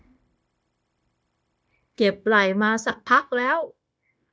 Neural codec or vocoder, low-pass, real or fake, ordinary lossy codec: codec, 16 kHz, 0.9 kbps, LongCat-Audio-Codec; none; fake; none